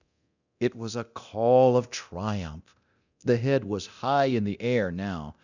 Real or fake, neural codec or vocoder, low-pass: fake; codec, 24 kHz, 0.9 kbps, DualCodec; 7.2 kHz